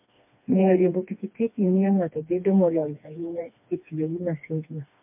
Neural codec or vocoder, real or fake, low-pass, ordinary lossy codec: codec, 16 kHz, 2 kbps, FreqCodec, smaller model; fake; 3.6 kHz; MP3, 32 kbps